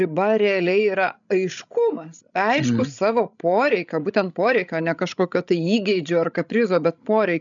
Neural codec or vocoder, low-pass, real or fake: codec, 16 kHz, 16 kbps, FreqCodec, larger model; 7.2 kHz; fake